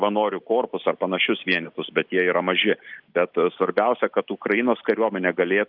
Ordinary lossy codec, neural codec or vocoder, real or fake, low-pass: Opus, 24 kbps; none; real; 5.4 kHz